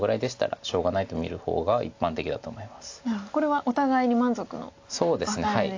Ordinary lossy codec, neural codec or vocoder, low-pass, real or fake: AAC, 48 kbps; none; 7.2 kHz; real